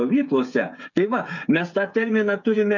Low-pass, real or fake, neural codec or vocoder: 7.2 kHz; fake; codec, 16 kHz, 8 kbps, FreqCodec, smaller model